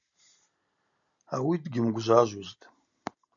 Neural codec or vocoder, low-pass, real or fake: none; 7.2 kHz; real